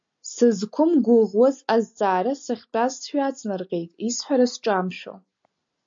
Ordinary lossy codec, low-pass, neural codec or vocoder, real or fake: MP3, 48 kbps; 7.2 kHz; none; real